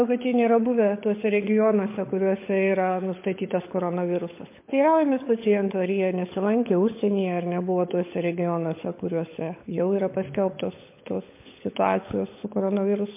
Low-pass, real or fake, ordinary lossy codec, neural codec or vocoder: 3.6 kHz; fake; MP3, 24 kbps; codec, 16 kHz, 16 kbps, FunCodec, trained on LibriTTS, 50 frames a second